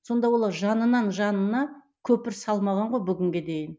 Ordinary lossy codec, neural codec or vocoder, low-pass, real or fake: none; none; none; real